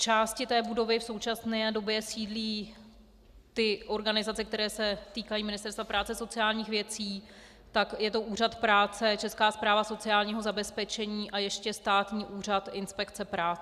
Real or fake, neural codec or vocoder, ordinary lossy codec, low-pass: real; none; Opus, 64 kbps; 14.4 kHz